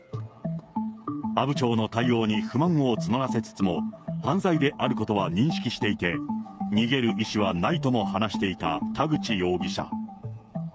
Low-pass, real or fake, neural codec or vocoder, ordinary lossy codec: none; fake; codec, 16 kHz, 8 kbps, FreqCodec, smaller model; none